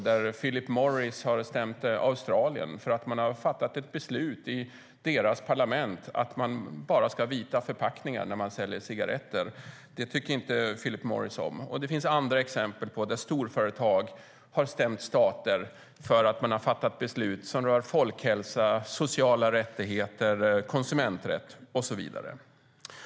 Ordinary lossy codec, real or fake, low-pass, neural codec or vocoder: none; real; none; none